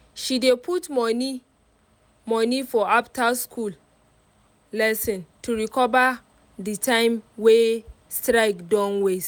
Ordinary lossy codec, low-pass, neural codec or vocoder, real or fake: none; none; none; real